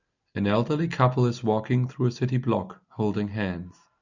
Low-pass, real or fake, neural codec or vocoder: 7.2 kHz; real; none